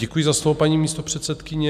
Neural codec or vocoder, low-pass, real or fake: none; 14.4 kHz; real